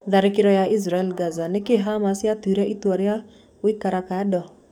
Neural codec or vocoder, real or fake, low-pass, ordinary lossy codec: codec, 44.1 kHz, 7.8 kbps, DAC; fake; 19.8 kHz; none